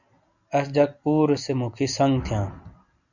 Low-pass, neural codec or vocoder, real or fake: 7.2 kHz; none; real